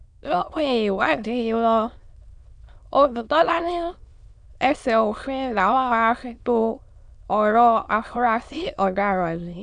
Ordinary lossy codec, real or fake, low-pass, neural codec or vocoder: none; fake; 9.9 kHz; autoencoder, 22.05 kHz, a latent of 192 numbers a frame, VITS, trained on many speakers